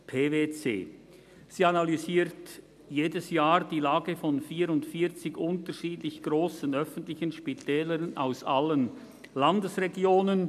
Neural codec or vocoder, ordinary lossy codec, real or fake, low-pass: none; none; real; 14.4 kHz